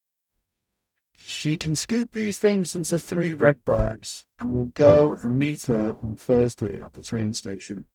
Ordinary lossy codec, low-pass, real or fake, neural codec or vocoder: none; 19.8 kHz; fake; codec, 44.1 kHz, 0.9 kbps, DAC